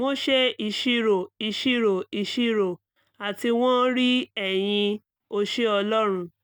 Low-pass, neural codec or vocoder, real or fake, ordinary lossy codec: none; none; real; none